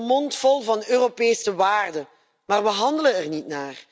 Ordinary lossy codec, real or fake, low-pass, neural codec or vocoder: none; real; none; none